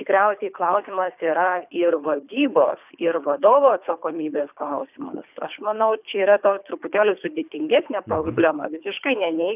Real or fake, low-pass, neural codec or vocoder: fake; 3.6 kHz; codec, 24 kHz, 3 kbps, HILCodec